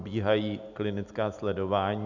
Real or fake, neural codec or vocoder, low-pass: real; none; 7.2 kHz